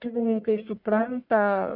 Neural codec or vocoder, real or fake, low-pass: codec, 44.1 kHz, 1.7 kbps, Pupu-Codec; fake; 5.4 kHz